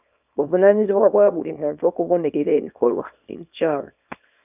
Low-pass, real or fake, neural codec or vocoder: 3.6 kHz; fake; codec, 24 kHz, 0.9 kbps, WavTokenizer, small release